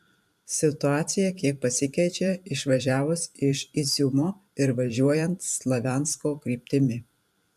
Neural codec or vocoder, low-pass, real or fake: vocoder, 44.1 kHz, 128 mel bands every 512 samples, BigVGAN v2; 14.4 kHz; fake